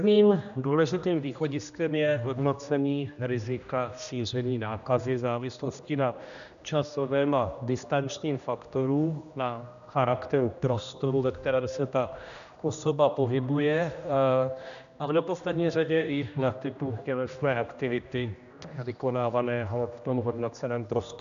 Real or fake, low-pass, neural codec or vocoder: fake; 7.2 kHz; codec, 16 kHz, 1 kbps, X-Codec, HuBERT features, trained on general audio